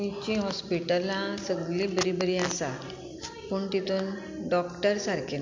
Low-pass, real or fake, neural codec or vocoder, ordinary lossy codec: 7.2 kHz; real; none; MP3, 48 kbps